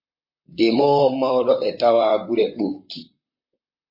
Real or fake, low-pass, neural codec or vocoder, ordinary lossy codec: fake; 5.4 kHz; vocoder, 44.1 kHz, 128 mel bands, Pupu-Vocoder; MP3, 32 kbps